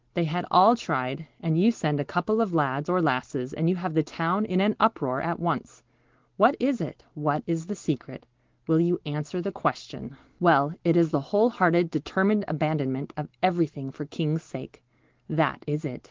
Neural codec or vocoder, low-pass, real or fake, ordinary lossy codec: none; 7.2 kHz; real; Opus, 16 kbps